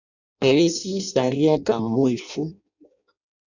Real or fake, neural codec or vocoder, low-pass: fake; codec, 16 kHz in and 24 kHz out, 0.6 kbps, FireRedTTS-2 codec; 7.2 kHz